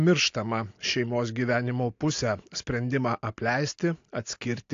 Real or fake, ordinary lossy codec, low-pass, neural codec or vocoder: real; AAC, 48 kbps; 7.2 kHz; none